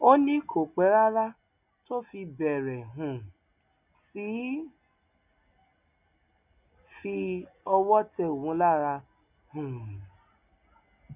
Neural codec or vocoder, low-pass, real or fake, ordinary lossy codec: none; 3.6 kHz; real; none